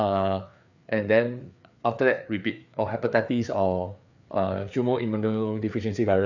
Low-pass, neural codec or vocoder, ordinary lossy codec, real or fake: 7.2 kHz; codec, 16 kHz, 4 kbps, FreqCodec, larger model; none; fake